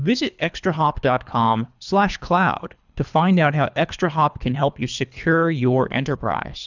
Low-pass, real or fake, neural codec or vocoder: 7.2 kHz; fake; codec, 24 kHz, 3 kbps, HILCodec